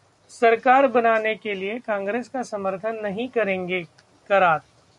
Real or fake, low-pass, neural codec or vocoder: real; 10.8 kHz; none